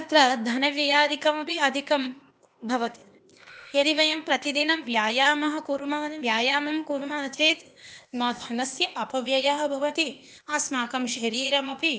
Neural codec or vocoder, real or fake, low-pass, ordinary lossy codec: codec, 16 kHz, 0.8 kbps, ZipCodec; fake; none; none